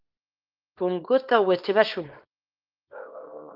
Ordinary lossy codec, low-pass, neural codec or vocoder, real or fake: Opus, 32 kbps; 5.4 kHz; codec, 24 kHz, 0.9 kbps, WavTokenizer, small release; fake